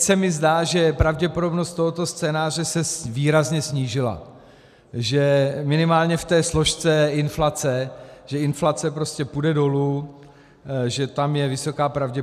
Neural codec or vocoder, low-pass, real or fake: none; 14.4 kHz; real